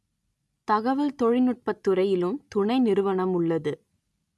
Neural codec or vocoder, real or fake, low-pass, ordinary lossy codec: none; real; none; none